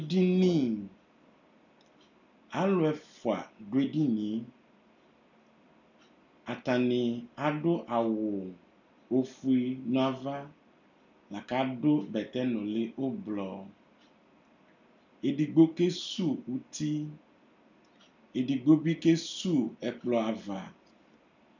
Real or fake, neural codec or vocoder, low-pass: real; none; 7.2 kHz